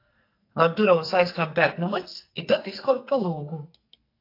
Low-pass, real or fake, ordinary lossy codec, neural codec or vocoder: 5.4 kHz; fake; AAC, 32 kbps; codec, 44.1 kHz, 2.6 kbps, SNAC